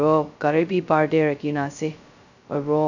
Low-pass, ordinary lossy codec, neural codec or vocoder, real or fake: 7.2 kHz; none; codec, 16 kHz, 0.2 kbps, FocalCodec; fake